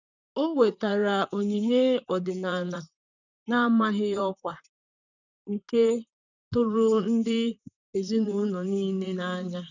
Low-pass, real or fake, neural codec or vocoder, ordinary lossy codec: 7.2 kHz; fake; vocoder, 44.1 kHz, 128 mel bands, Pupu-Vocoder; none